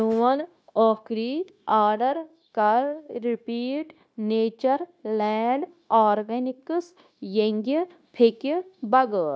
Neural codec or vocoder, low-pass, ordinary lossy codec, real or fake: codec, 16 kHz, 0.9 kbps, LongCat-Audio-Codec; none; none; fake